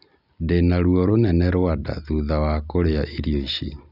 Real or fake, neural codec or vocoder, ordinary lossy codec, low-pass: real; none; none; 5.4 kHz